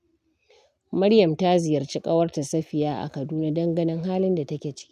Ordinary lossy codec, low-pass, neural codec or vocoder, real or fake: none; 14.4 kHz; none; real